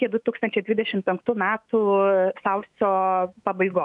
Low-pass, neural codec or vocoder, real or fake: 10.8 kHz; autoencoder, 48 kHz, 128 numbers a frame, DAC-VAE, trained on Japanese speech; fake